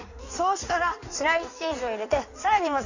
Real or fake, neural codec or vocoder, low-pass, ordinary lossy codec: fake; codec, 16 kHz in and 24 kHz out, 1.1 kbps, FireRedTTS-2 codec; 7.2 kHz; none